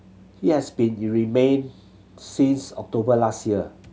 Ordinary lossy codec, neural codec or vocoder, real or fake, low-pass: none; none; real; none